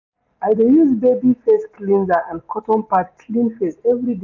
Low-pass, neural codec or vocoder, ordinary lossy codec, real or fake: 7.2 kHz; none; none; real